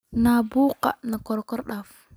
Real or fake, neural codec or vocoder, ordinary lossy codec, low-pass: real; none; none; none